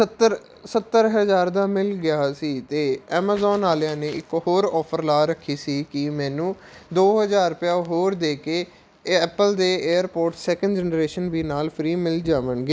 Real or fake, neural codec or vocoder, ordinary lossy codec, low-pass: real; none; none; none